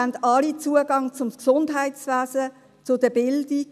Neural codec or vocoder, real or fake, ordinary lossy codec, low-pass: none; real; none; 14.4 kHz